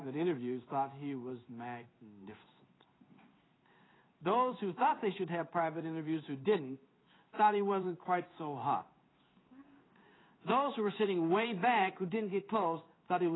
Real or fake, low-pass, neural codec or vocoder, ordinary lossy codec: fake; 7.2 kHz; codec, 16 kHz in and 24 kHz out, 1 kbps, XY-Tokenizer; AAC, 16 kbps